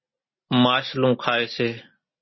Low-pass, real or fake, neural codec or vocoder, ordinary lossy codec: 7.2 kHz; real; none; MP3, 24 kbps